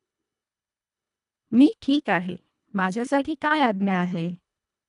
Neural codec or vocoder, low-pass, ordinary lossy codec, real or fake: codec, 24 kHz, 1.5 kbps, HILCodec; 10.8 kHz; none; fake